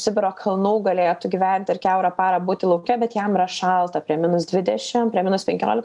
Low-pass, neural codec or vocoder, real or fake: 10.8 kHz; none; real